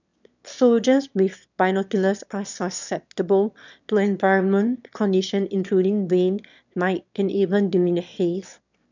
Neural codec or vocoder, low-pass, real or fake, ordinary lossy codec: autoencoder, 22.05 kHz, a latent of 192 numbers a frame, VITS, trained on one speaker; 7.2 kHz; fake; none